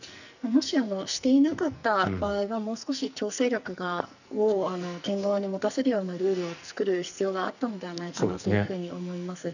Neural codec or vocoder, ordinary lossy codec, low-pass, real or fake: codec, 44.1 kHz, 2.6 kbps, SNAC; none; 7.2 kHz; fake